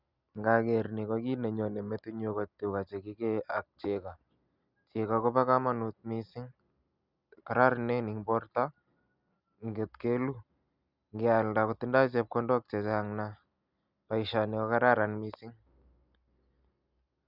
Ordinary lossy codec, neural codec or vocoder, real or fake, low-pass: Opus, 64 kbps; none; real; 5.4 kHz